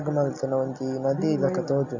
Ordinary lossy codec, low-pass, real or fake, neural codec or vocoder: Opus, 64 kbps; 7.2 kHz; real; none